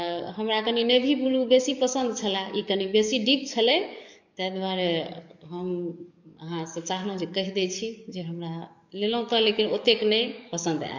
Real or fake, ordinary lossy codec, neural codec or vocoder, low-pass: fake; none; codec, 44.1 kHz, 7.8 kbps, DAC; 7.2 kHz